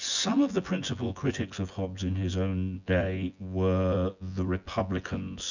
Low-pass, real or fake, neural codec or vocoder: 7.2 kHz; fake; vocoder, 24 kHz, 100 mel bands, Vocos